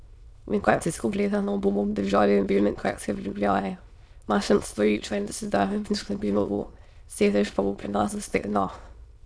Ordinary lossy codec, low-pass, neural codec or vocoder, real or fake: none; none; autoencoder, 22.05 kHz, a latent of 192 numbers a frame, VITS, trained on many speakers; fake